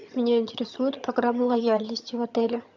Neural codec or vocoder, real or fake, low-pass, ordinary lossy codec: vocoder, 22.05 kHz, 80 mel bands, HiFi-GAN; fake; 7.2 kHz; AAC, 48 kbps